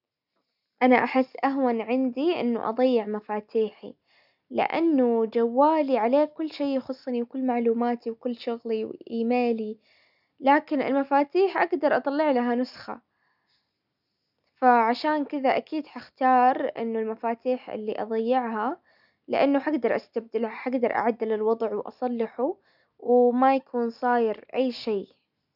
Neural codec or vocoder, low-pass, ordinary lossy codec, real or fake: none; 5.4 kHz; none; real